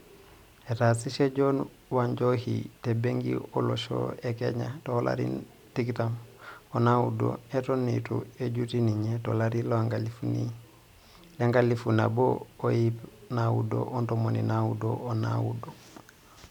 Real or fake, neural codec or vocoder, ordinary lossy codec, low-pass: fake; vocoder, 44.1 kHz, 128 mel bands every 256 samples, BigVGAN v2; none; 19.8 kHz